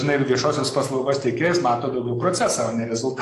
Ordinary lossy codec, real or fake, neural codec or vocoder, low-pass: AAC, 48 kbps; fake; codec, 44.1 kHz, 7.8 kbps, DAC; 14.4 kHz